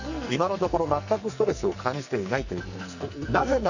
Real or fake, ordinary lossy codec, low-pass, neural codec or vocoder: fake; none; 7.2 kHz; codec, 44.1 kHz, 2.6 kbps, SNAC